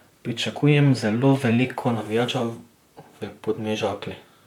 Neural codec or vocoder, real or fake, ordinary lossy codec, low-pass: vocoder, 44.1 kHz, 128 mel bands, Pupu-Vocoder; fake; none; 19.8 kHz